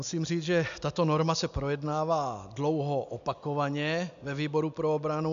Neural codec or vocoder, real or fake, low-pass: none; real; 7.2 kHz